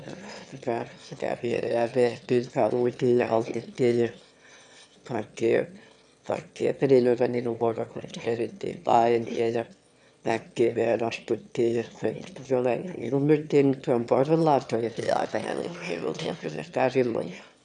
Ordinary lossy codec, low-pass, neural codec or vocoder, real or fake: none; 9.9 kHz; autoencoder, 22.05 kHz, a latent of 192 numbers a frame, VITS, trained on one speaker; fake